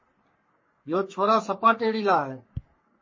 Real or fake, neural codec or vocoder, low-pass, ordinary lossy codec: fake; codec, 44.1 kHz, 3.4 kbps, Pupu-Codec; 7.2 kHz; MP3, 32 kbps